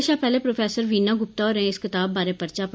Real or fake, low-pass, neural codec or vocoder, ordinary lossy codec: real; none; none; none